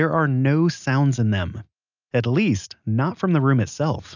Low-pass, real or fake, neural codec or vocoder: 7.2 kHz; real; none